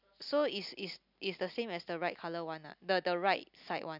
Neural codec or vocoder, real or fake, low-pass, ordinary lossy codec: none; real; 5.4 kHz; none